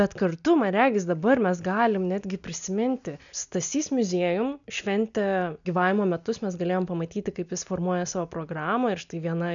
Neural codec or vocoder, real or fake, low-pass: none; real; 7.2 kHz